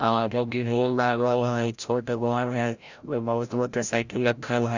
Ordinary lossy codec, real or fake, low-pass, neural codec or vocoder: Opus, 64 kbps; fake; 7.2 kHz; codec, 16 kHz, 0.5 kbps, FreqCodec, larger model